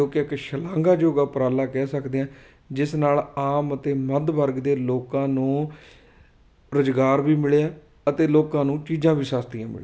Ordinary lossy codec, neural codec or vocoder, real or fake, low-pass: none; none; real; none